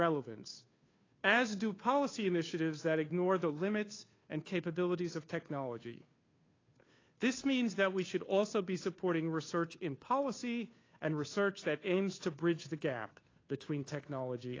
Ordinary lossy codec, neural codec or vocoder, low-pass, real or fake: AAC, 32 kbps; codec, 16 kHz, 2 kbps, FunCodec, trained on Chinese and English, 25 frames a second; 7.2 kHz; fake